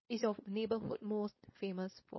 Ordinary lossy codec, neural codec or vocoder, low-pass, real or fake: MP3, 24 kbps; codec, 16 kHz, 4.8 kbps, FACodec; 7.2 kHz; fake